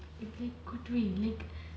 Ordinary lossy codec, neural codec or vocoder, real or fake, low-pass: none; none; real; none